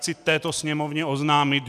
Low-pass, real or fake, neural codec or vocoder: 14.4 kHz; real; none